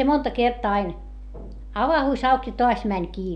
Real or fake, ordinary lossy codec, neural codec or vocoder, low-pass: real; none; none; 9.9 kHz